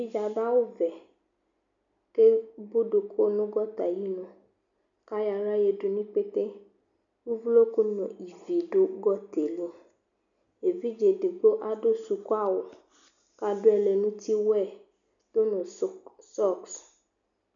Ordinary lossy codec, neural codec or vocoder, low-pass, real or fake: MP3, 96 kbps; none; 7.2 kHz; real